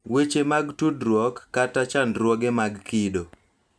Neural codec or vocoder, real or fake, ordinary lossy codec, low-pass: none; real; none; none